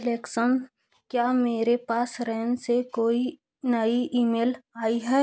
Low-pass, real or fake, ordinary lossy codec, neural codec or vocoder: none; real; none; none